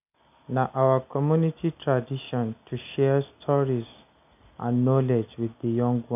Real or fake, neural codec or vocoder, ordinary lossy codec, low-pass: real; none; none; 3.6 kHz